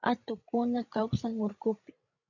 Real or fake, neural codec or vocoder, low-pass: fake; codec, 16 kHz, 16 kbps, FreqCodec, larger model; 7.2 kHz